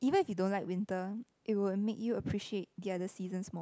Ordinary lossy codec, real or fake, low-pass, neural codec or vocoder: none; real; none; none